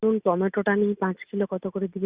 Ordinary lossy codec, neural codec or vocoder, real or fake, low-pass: Opus, 64 kbps; none; real; 3.6 kHz